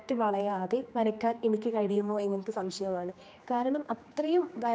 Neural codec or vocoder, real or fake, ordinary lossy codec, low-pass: codec, 16 kHz, 2 kbps, X-Codec, HuBERT features, trained on general audio; fake; none; none